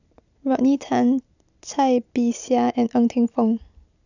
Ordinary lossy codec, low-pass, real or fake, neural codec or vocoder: none; 7.2 kHz; real; none